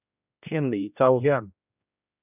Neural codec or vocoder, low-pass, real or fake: codec, 16 kHz, 1 kbps, X-Codec, HuBERT features, trained on general audio; 3.6 kHz; fake